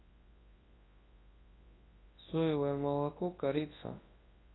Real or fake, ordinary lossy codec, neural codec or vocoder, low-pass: fake; AAC, 16 kbps; codec, 24 kHz, 0.9 kbps, WavTokenizer, large speech release; 7.2 kHz